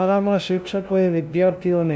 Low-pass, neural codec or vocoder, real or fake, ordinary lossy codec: none; codec, 16 kHz, 0.5 kbps, FunCodec, trained on LibriTTS, 25 frames a second; fake; none